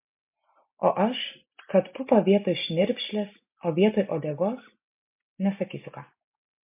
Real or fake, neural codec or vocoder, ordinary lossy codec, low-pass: real; none; MP3, 32 kbps; 3.6 kHz